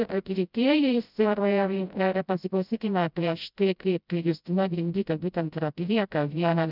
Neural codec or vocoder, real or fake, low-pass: codec, 16 kHz, 0.5 kbps, FreqCodec, smaller model; fake; 5.4 kHz